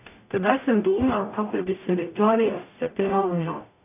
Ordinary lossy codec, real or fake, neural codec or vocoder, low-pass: none; fake; codec, 44.1 kHz, 0.9 kbps, DAC; 3.6 kHz